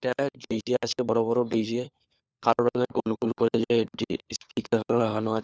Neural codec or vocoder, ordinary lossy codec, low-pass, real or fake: codec, 16 kHz, 4 kbps, FunCodec, trained on LibriTTS, 50 frames a second; none; none; fake